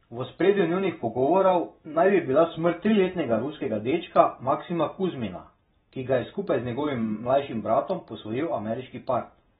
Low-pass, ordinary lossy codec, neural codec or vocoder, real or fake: 7.2 kHz; AAC, 16 kbps; none; real